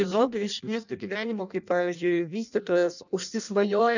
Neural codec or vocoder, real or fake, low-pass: codec, 16 kHz in and 24 kHz out, 0.6 kbps, FireRedTTS-2 codec; fake; 7.2 kHz